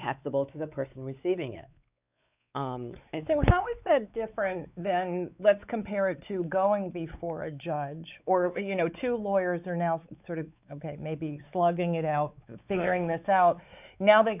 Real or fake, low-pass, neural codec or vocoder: fake; 3.6 kHz; codec, 16 kHz, 4 kbps, X-Codec, WavLM features, trained on Multilingual LibriSpeech